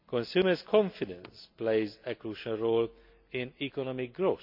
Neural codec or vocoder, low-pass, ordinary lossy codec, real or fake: none; 5.4 kHz; MP3, 48 kbps; real